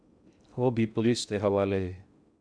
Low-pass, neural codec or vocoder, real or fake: 9.9 kHz; codec, 16 kHz in and 24 kHz out, 0.6 kbps, FocalCodec, streaming, 2048 codes; fake